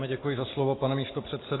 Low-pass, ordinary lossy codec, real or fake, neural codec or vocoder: 7.2 kHz; AAC, 16 kbps; real; none